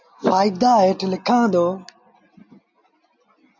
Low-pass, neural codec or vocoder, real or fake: 7.2 kHz; none; real